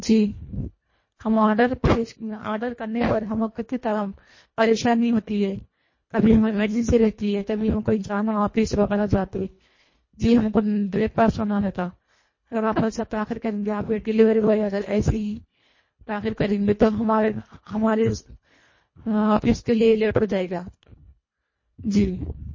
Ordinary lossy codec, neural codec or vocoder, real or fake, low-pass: MP3, 32 kbps; codec, 24 kHz, 1.5 kbps, HILCodec; fake; 7.2 kHz